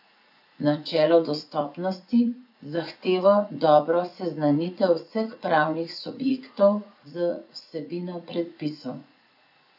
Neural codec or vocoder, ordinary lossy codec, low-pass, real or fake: vocoder, 44.1 kHz, 80 mel bands, Vocos; none; 5.4 kHz; fake